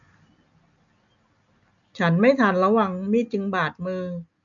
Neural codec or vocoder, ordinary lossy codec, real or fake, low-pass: none; none; real; 7.2 kHz